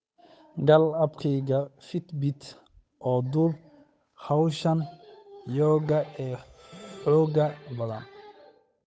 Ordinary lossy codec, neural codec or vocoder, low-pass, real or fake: none; codec, 16 kHz, 8 kbps, FunCodec, trained on Chinese and English, 25 frames a second; none; fake